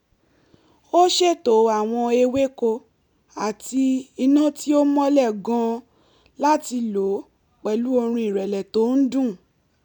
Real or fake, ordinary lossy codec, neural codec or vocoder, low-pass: real; none; none; 19.8 kHz